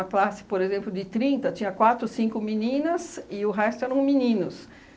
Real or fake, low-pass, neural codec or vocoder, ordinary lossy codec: real; none; none; none